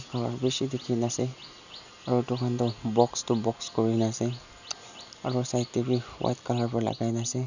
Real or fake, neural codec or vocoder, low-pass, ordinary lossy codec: real; none; 7.2 kHz; none